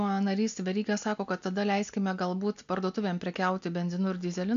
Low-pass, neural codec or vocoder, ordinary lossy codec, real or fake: 7.2 kHz; none; AAC, 96 kbps; real